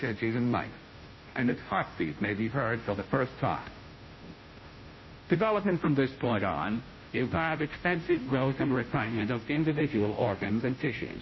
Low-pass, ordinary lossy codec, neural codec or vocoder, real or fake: 7.2 kHz; MP3, 24 kbps; codec, 16 kHz, 0.5 kbps, FunCodec, trained on Chinese and English, 25 frames a second; fake